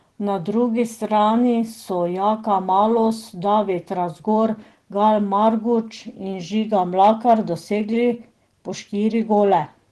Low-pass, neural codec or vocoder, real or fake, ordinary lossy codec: 10.8 kHz; none; real; Opus, 16 kbps